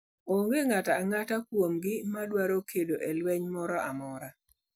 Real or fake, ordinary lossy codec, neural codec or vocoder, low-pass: real; none; none; none